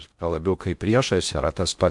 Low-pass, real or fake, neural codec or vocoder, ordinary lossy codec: 10.8 kHz; fake; codec, 16 kHz in and 24 kHz out, 0.6 kbps, FocalCodec, streaming, 2048 codes; MP3, 64 kbps